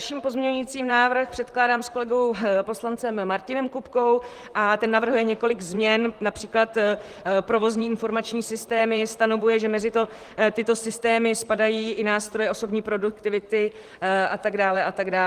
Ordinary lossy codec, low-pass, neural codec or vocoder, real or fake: Opus, 24 kbps; 14.4 kHz; vocoder, 44.1 kHz, 128 mel bands, Pupu-Vocoder; fake